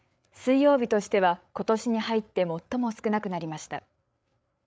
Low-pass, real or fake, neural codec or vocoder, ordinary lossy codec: none; fake; codec, 16 kHz, 8 kbps, FreqCodec, larger model; none